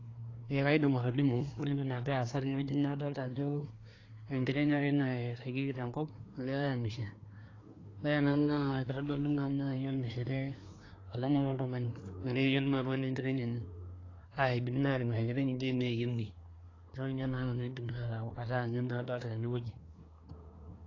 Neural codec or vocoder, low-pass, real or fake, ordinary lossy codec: codec, 24 kHz, 1 kbps, SNAC; 7.2 kHz; fake; AAC, 32 kbps